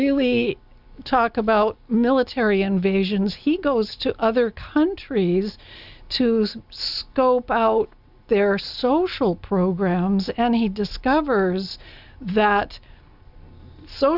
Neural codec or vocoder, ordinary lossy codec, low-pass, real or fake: none; Opus, 64 kbps; 5.4 kHz; real